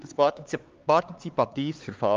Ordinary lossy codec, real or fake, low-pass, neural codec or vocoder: Opus, 32 kbps; fake; 7.2 kHz; codec, 16 kHz, 4 kbps, X-Codec, HuBERT features, trained on LibriSpeech